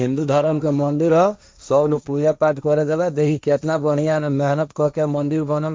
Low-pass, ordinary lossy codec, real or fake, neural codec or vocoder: none; none; fake; codec, 16 kHz, 1.1 kbps, Voila-Tokenizer